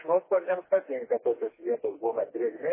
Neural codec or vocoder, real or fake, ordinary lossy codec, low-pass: codec, 16 kHz, 2 kbps, FreqCodec, smaller model; fake; MP3, 16 kbps; 3.6 kHz